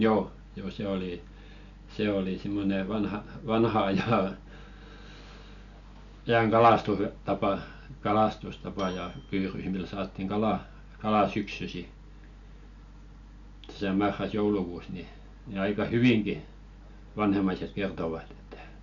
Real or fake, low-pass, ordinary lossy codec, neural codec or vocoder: real; 7.2 kHz; none; none